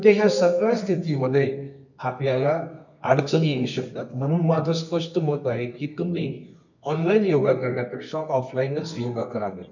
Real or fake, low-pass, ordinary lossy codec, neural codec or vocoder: fake; 7.2 kHz; none; codec, 24 kHz, 0.9 kbps, WavTokenizer, medium music audio release